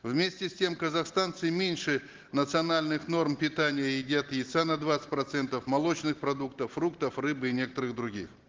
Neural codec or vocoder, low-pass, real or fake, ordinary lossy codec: none; 7.2 kHz; real; Opus, 16 kbps